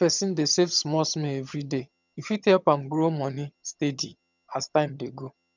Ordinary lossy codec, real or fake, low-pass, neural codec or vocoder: none; fake; 7.2 kHz; vocoder, 22.05 kHz, 80 mel bands, HiFi-GAN